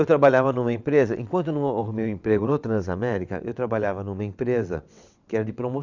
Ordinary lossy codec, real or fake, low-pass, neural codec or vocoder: none; fake; 7.2 kHz; vocoder, 22.05 kHz, 80 mel bands, WaveNeXt